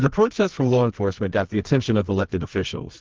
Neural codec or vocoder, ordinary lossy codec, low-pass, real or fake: codec, 24 kHz, 0.9 kbps, WavTokenizer, medium music audio release; Opus, 16 kbps; 7.2 kHz; fake